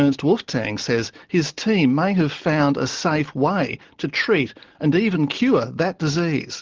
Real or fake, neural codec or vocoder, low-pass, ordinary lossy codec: real; none; 7.2 kHz; Opus, 16 kbps